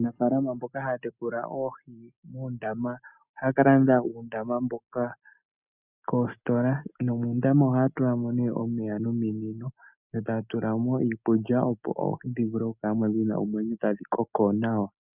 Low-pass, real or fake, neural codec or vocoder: 3.6 kHz; real; none